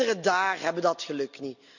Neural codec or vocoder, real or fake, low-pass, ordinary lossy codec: none; real; 7.2 kHz; none